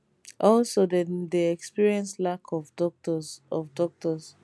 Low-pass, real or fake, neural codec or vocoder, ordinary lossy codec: none; real; none; none